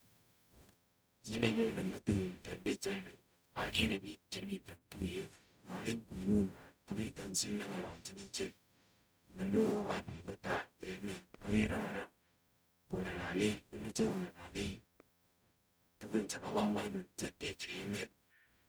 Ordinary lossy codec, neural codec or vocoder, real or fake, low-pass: none; codec, 44.1 kHz, 0.9 kbps, DAC; fake; none